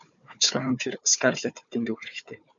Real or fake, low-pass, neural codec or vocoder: fake; 7.2 kHz; codec, 16 kHz, 4 kbps, FunCodec, trained on Chinese and English, 50 frames a second